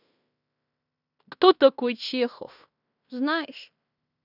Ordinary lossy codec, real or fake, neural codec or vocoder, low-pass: none; fake; codec, 16 kHz in and 24 kHz out, 0.9 kbps, LongCat-Audio-Codec, fine tuned four codebook decoder; 5.4 kHz